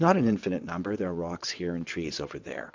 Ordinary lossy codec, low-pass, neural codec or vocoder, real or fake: MP3, 64 kbps; 7.2 kHz; vocoder, 22.05 kHz, 80 mel bands, WaveNeXt; fake